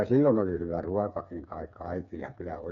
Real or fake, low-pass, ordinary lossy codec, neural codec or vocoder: fake; 7.2 kHz; none; codec, 16 kHz, 4 kbps, FreqCodec, smaller model